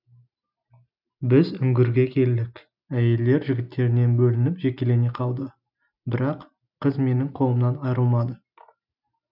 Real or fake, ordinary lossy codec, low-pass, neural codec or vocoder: real; none; 5.4 kHz; none